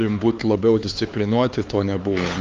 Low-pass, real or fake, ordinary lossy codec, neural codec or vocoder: 7.2 kHz; fake; Opus, 24 kbps; codec, 16 kHz, 4 kbps, X-Codec, HuBERT features, trained on LibriSpeech